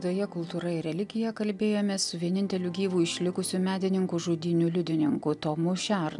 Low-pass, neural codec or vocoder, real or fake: 10.8 kHz; none; real